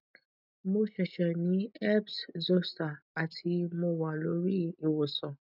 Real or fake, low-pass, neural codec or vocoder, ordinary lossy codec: real; 5.4 kHz; none; none